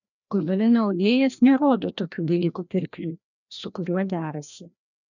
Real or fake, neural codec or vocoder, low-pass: fake; codec, 16 kHz, 1 kbps, FreqCodec, larger model; 7.2 kHz